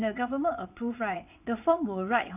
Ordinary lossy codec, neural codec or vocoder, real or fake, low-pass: none; vocoder, 22.05 kHz, 80 mel bands, WaveNeXt; fake; 3.6 kHz